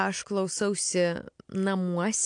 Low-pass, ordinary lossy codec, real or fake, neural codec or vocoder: 9.9 kHz; AAC, 64 kbps; real; none